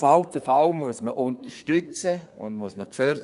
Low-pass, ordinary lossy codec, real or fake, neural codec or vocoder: 10.8 kHz; none; fake; codec, 24 kHz, 1 kbps, SNAC